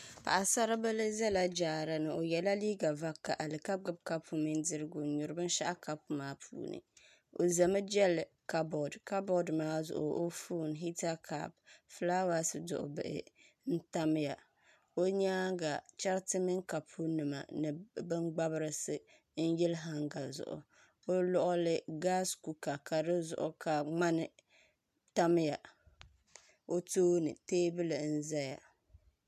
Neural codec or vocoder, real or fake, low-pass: none; real; 14.4 kHz